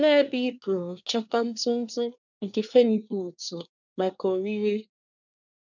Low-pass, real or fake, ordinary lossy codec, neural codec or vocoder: 7.2 kHz; fake; none; codec, 24 kHz, 1 kbps, SNAC